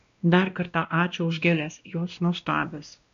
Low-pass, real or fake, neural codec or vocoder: 7.2 kHz; fake; codec, 16 kHz, 1 kbps, X-Codec, WavLM features, trained on Multilingual LibriSpeech